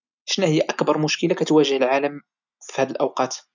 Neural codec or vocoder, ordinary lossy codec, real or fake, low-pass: none; none; real; 7.2 kHz